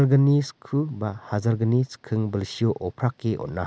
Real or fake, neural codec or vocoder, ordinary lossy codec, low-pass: real; none; none; none